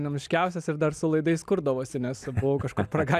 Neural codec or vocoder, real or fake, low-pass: none; real; 14.4 kHz